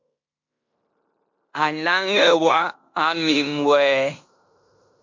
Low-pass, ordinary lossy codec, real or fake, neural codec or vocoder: 7.2 kHz; MP3, 48 kbps; fake; codec, 16 kHz in and 24 kHz out, 0.9 kbps, LongCat-Audio-Codec, fine tuned four codebook decoder